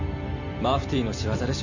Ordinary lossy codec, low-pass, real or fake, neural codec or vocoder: none; 7.2 kHz; real; none